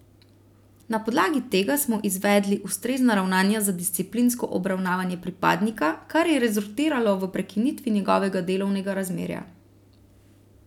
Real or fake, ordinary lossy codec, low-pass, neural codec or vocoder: real; none; 19.8 kHz; none